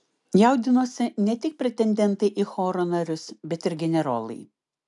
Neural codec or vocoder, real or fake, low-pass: none; real; 10.8 kHz